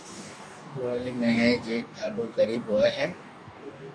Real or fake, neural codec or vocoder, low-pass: fake; codec, 44.1 kHz, 2.6 kbps, DAC; 9.9 kHz